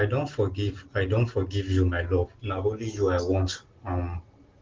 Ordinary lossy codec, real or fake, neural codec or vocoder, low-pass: Opus, 16 kbps; real; none; 7.2 kHz